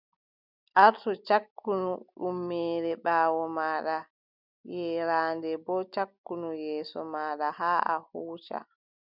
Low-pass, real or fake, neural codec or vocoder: 5.4 kHz; real; none